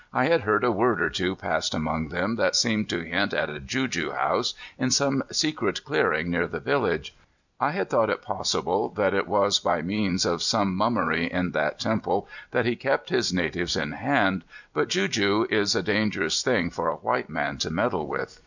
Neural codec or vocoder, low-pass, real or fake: none; 7.2 kHz; real